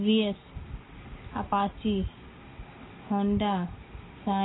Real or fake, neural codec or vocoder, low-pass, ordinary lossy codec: real; none; 7.2 kHz; AAC, 16 kbps